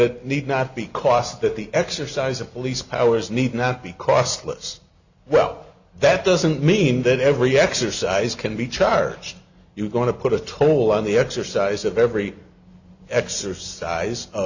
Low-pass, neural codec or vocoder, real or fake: 7.2 kHz; none; real